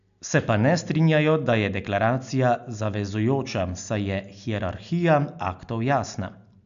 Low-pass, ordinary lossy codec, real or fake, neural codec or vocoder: 7.2 kHz; none; real; none